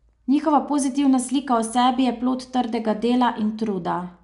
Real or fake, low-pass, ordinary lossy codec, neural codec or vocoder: real; 10.8 kHz; none; none